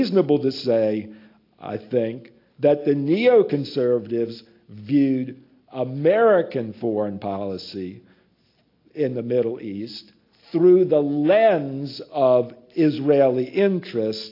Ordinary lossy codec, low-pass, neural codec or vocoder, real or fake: AAC, 32 kbps; 5.4 kHz; none; real